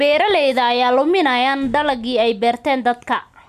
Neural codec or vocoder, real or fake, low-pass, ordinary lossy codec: none; real; 14.4 kHz; none